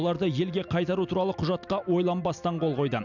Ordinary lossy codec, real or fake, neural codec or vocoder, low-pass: none; real; none; 7.2 kHz